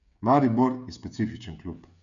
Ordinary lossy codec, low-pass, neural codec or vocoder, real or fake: none; 7.2 kHz; codec, 16 kHz, 16 kbps, FreqCodec, smaller model; fake